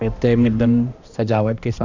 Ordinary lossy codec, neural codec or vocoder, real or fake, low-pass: none; codec, 16 kHz, 1 kbps, X-Codec, HuBERT features, trained on balanced general audio; fake; 7.2 kHz